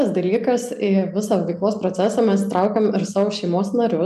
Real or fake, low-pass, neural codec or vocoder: real; 14.4 kHz; none